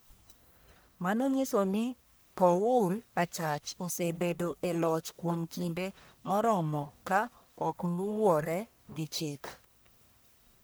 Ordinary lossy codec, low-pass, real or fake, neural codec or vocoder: none; none; fake; codec, 44.1 kHz, 1.7 kbps, Pupu-Codec